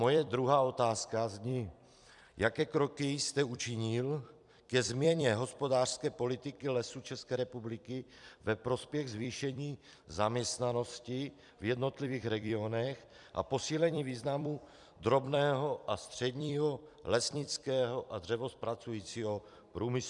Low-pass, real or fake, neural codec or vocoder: 10.8 kHz; fake; vocoder, 44.1 kHz, 128 mel bands every 256 samples, BigVGAN v2